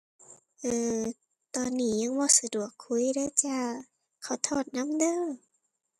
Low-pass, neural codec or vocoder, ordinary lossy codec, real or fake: 14.4 kHz; none; none; real